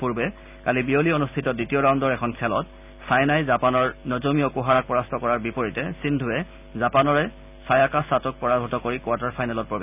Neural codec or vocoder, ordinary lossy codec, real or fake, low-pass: none; none; real; 3.6 kHz